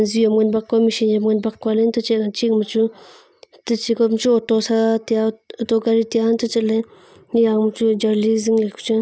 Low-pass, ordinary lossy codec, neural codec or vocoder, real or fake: none; none; none; real